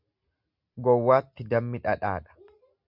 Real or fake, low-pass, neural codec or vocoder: real; 5.4 kHz; none